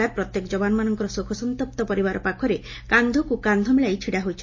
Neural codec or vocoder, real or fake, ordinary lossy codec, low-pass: none; real; MP3, 32 kbps; 7.2 kHz